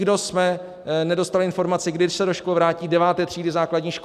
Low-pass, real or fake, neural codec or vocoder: 14.4 kHz; real; none